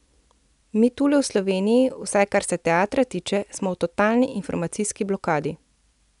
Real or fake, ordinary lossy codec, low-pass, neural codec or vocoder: real; none; 10.8 kHz; none